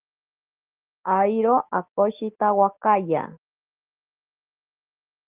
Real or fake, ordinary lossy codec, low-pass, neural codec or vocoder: real; Opus, 16 kbps; 3.6 kHz; none